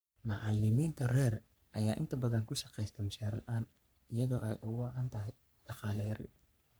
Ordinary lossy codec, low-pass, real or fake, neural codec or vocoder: none; none; fake; codec, 44.1 kHz, 3.4 kbps, Pupu-Codec